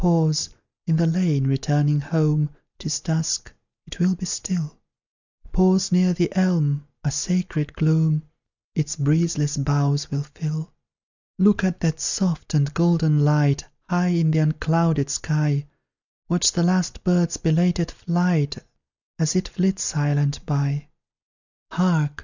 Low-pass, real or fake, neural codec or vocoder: 7.2 kHz; real; none